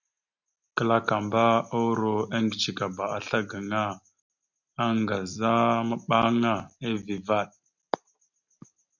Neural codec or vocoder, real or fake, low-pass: none; real; 7.2 kHz